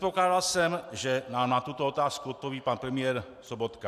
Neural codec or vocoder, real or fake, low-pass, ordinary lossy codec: none; real; 14.4 kHz; MP3, 96 kbps